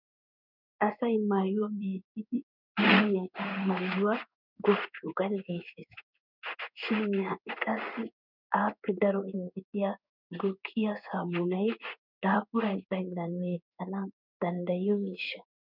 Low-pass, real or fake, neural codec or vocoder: 5.4 kHz; fake; codec, 16 kHz in and 24 kHz out, 1 kbps, XY-Tokenizer